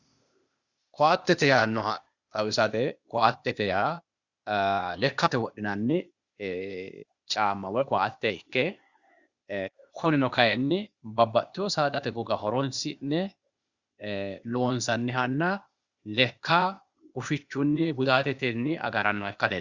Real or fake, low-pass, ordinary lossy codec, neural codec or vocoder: fake; 7.2 kHz; Opus, 64 kbps; codec, 16 kHz, 0.8 kbps, ZipCodec